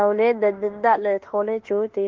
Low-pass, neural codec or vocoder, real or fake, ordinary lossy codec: 7.2 kHz; autoencoder, 48 kHz, 32 numbers a frame, DAC-VAE, trained on Japanese speech; fake; Opus, 16 kbps